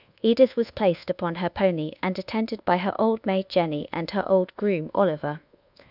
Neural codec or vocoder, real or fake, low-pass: codec, 24 kHz, 1.2 kbps, DualCodec; fake; 5.4 kHz